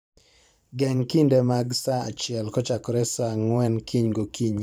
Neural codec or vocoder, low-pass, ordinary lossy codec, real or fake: vocoder, 44.1 kHz, 128 mel bands every 512 samples, BigVGAN v2; none; none; fake